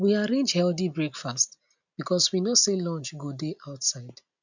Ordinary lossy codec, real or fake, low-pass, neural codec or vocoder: none; real; 7.2 kHz; none